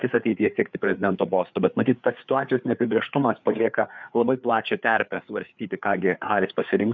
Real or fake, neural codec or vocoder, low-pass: fake; codec, 16 kHz, 4 kbps, FreqCodec, larger model; 7.2 kHz